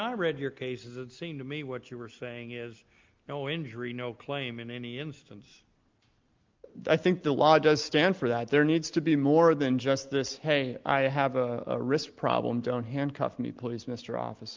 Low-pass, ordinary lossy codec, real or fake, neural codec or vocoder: 7.2 kHz; Opus, 24 kbps; real; none